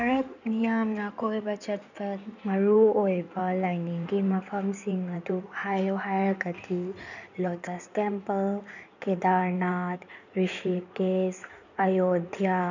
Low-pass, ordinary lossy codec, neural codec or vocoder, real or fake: 7.2 kHz; none; codec, 16 kHz in and 24 kHz out, 2.2 kbps, FireRedTTS-2 codec; fake